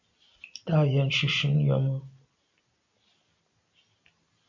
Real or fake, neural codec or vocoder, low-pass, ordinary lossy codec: real; none; 7.2 kHz; MP3, 48 kbps